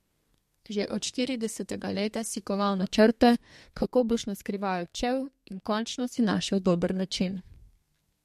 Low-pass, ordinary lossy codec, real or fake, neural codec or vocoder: 14.4 kHz; MP3, 64 kbps; fake; codec, 32 kHz, 1.9 kbps, SNAC